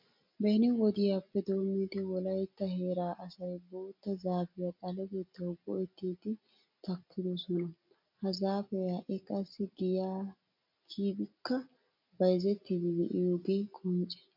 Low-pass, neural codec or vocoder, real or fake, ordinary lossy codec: 5.4 kHz; none; real; MP3, 48 kbps